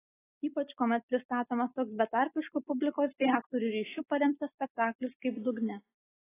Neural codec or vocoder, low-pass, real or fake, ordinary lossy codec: none; 3.6 kHz; real; AAC, 16 kbps